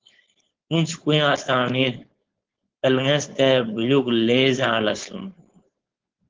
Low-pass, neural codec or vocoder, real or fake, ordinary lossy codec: 7.2 kHz; codec, 16 kHz, 4.8 kbps, FACodec; fake; Opus, 16 kbps